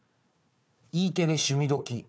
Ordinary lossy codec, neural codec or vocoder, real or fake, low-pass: none; codec, 16 kHz, 4 kbps, FunCodec, trained on Chinese and English, 50 frames a second; fake; none